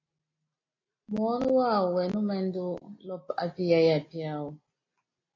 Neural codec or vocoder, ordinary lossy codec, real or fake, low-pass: none; AAC, 32 kbps; real; 7.2 kHz